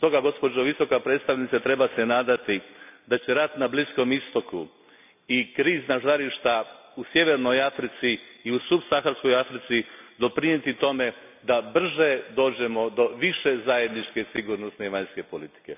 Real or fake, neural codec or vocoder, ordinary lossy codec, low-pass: real; none; none; 3.6 kHz